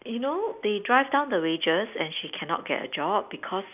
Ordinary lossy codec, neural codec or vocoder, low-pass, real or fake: none; none; 3.6 kHz; real